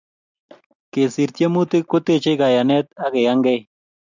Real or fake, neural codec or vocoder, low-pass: real; none; 7.2 kHz